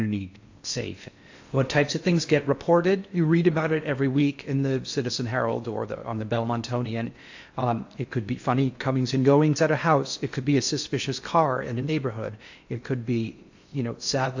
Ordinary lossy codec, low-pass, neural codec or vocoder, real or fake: AAC, 48 kbps; 7.2 kHz; codec, 16 kHz in and 24 kHz out, 0.6 kbps, FocalCodec, streaming, 4096 codes; fake